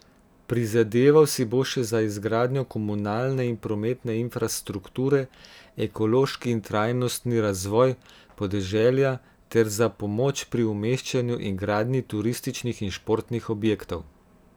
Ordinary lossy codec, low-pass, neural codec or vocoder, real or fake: none; none; none; real